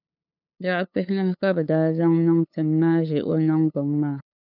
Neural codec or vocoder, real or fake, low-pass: codec, 16 kHz, 8 kbps, FunCodec, trained on LibriTTS, 25 frames a second; fake; 5.4 kHz